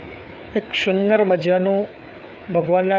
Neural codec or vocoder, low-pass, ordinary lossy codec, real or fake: codec, 16 kHz, 4 kbps, FreqCodec, larger model; none; none; fake